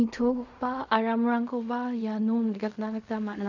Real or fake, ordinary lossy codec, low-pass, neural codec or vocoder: fake; none; 7.2 kHz; codec, 16 kHz in and 24 kHz out, 0.4 kbps, LongCat-Audio-Codec, fine tuned four codebook decoder